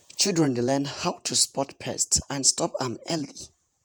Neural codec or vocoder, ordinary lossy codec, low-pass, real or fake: vocoder, 48 kHz, 128 mel bands, Vocos; none; none; fake